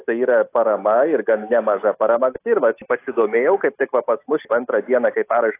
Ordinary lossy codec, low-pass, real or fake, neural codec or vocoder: AAC, 24 kbps; 3.6 kHz; real; none